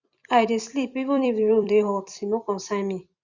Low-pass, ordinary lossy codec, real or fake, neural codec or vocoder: 7.2 kHz; Opus, 64 kbps; fake; vocoder, 44.1 kHz, 128 mel bands every 256 samples, BigVGAN v2